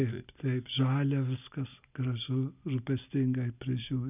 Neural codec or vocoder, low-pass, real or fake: none; 3.6 kHz; real